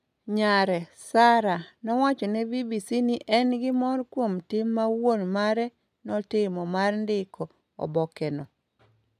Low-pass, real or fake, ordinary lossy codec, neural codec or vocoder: 14.4 kHz; real; none; none